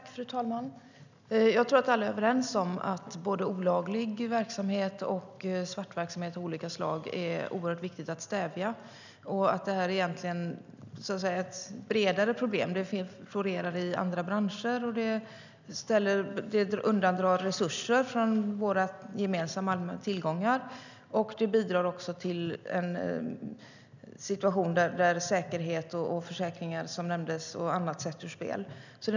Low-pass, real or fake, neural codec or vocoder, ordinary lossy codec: 7.2 kHz; real; none; none